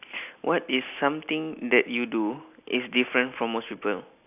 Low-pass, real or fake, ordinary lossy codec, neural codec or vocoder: 3.6 kHz; real; none; none